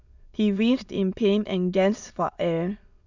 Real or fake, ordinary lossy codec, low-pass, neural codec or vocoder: fake; none; 7.2 kHz; autoencoder, 22.05 kHz, a latent of 192 numbers a frame, VITS, trained on many speakers